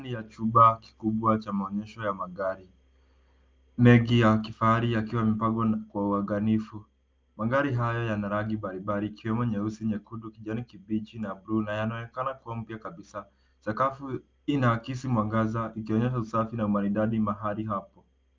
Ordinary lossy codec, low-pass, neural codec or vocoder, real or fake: Opus, 24 kbps; 7.2 kHz; none; real